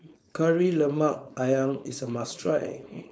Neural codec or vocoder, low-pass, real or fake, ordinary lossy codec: codec, 16 kHz, 4.8 kbps, FACodec; none; fake; none